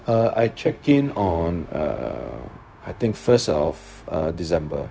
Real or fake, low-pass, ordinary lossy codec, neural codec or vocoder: fake; none; none; codec, 16 kHz, 0.4 kbps, LongCat-Audio-Codec